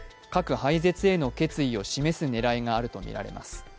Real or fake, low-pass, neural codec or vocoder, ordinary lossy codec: real; none; none; none